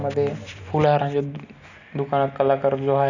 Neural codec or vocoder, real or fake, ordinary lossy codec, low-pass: none; real; none; 7.2 kHz